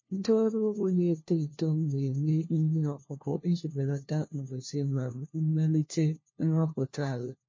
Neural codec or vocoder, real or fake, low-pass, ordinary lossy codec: codec, 16 kHz, 1 kbps, FunCodec, trained on LibriTTS, 50 frames a second; fake; 7.2 kHz; MP3, 32 kbps